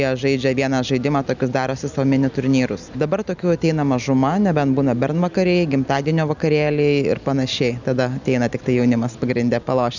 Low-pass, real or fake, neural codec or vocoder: 7.2 kHz; real; none